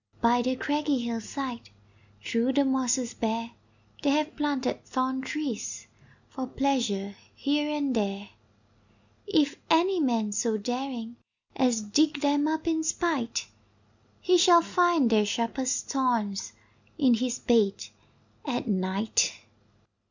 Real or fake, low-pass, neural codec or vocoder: real; 7.2 kHz; none